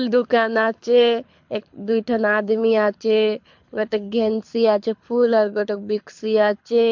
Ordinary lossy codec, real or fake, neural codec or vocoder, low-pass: MP3, 48 kbps; fake; codec, 24 kHz, 6 kbps, HILCodec; 7.2 kHz